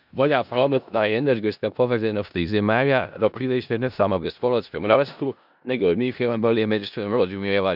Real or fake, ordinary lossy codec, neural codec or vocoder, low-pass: fake; none; codec, 16 kHz in and 24 kHz out, 0.4 kbps, LongCat-Audio-Codec, four codebook decoder; 5.4 kHz